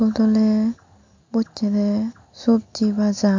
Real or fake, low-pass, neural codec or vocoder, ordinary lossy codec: real; 7.2 kHz; none; MP3, 64 kbps